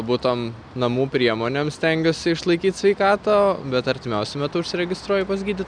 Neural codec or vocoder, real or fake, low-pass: none; real; 9.9 kHz